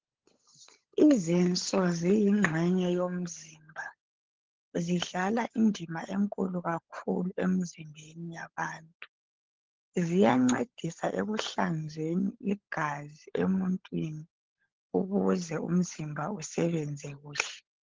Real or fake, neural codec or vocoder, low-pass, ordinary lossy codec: fake; codec, 16 kHz, 16 kbps, FunCodec, trained on LibriTTS, 50 frames a second; 7.2 kHz; Opus, 16 kbps